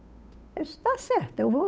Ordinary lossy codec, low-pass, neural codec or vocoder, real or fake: none; none; codec, 16 kHz, 8 kbps, FunCodec, trained on Chinese and English, 25 frames a second; fake